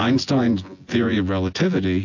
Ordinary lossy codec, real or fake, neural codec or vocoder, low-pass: AAC, 48 kbps; fake; vocoder, 24 kHz, 100 mel bands, Vocos; 7.2 kHz